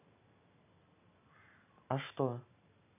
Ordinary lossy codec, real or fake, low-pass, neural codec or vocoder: none; real; 3.6 kHz; none